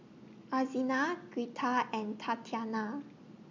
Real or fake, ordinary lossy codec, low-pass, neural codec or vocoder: real; MP3, 64 kbps; 7.2 kHz; none